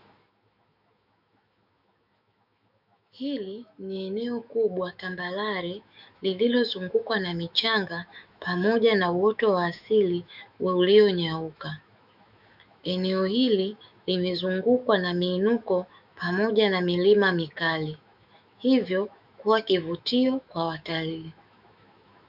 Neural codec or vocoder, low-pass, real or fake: autoencoder, 48 kHz, 128 numbers a frame, DAC-VAE, trained on Japanese speech; 5.4 kHz; fake